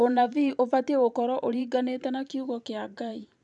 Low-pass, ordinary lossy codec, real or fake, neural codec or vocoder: 10.8 kHz; none; fake; vocoder, 44.1 kHz, 128 mel bands every 512 samples, BigVGAN v2